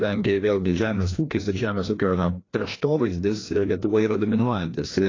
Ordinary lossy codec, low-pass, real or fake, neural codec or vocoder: AAC, 32 kbps; 7.2 kHz; fake; codec, 16 kHz, 1 kbps, FreqCodec, larger model